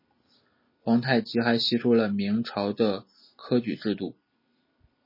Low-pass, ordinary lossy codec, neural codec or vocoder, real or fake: 5.4 kHz; MP3, 24 kbps; none; real